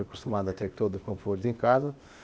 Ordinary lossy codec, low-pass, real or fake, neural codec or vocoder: none; none; fake; codec, 16 kHz, 0.8 kbps, ZipCodec